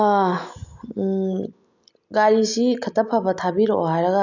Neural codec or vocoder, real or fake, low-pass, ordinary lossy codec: none; real; 7.2 kHz; none